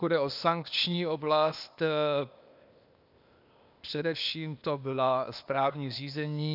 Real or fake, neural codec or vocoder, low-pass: fake; codec, 16 kHz, 0.8 kbps, ZipCodec; 5.4 kHz